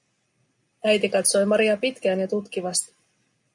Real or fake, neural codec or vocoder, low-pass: real; none; 10.8 kHz